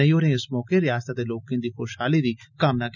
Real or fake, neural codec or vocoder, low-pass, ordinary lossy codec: real; none; 7.2 kHz; none